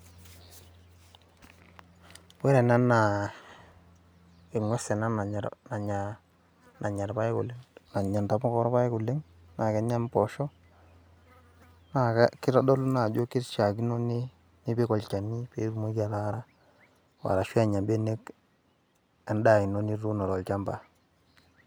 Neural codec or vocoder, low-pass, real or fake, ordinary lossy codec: none; none; real; none